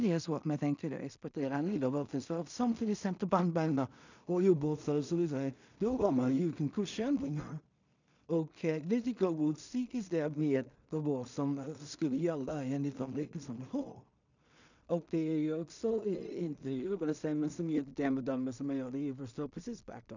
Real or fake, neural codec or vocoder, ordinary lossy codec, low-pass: fake; codec, 16 kHz in and 24 kHz out, 0.4 kbps, LongCat-Audio-Codec, two codebook decoder; none; 7.2 kHz